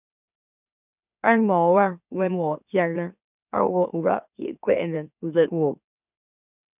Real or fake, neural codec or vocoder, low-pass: fake; autoencoder, 44.1 kHz, a latent of 192 numbers a frame, MeloTTS; 3.6 kHz